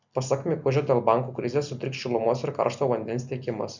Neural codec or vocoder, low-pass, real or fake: none; 7.2 kHz; real